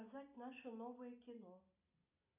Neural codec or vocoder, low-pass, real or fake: none; 3.6 kHz; real